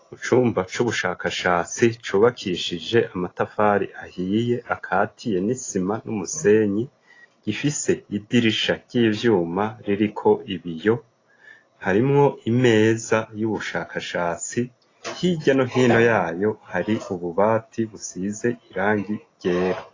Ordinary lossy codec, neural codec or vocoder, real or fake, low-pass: AAC, 32 kbps; none; real; 7.2 kHz